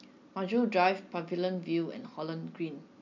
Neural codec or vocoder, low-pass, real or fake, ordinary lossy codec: none; 7.2 kHz; real; MP3, 64 kbps